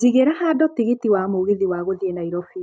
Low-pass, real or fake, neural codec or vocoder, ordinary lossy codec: none; real; none; none